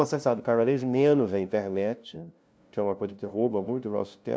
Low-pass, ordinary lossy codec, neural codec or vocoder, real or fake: none; none; codec, 16 kHz, 0.5 kbps, FunCodec, trained on LibriTTS, 25 frames a second; fake